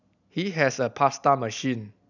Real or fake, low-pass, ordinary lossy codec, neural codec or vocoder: real; 7.2 kHz; none; none